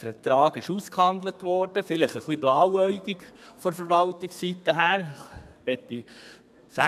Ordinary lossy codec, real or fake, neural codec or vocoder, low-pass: none; fake; codec, 32 kHz, 1.9 kbps, SNAC; 14.4 kHz